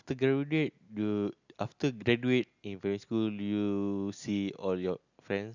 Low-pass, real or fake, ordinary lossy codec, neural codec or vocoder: 7.2 kHz; real; none; none